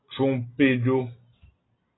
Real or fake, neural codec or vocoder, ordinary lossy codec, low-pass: real; none; AAC, 16 kbps; 7.2 kHz